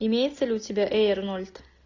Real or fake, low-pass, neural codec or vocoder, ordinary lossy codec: real; 7.2 kHz; none; AAC, 48 kbps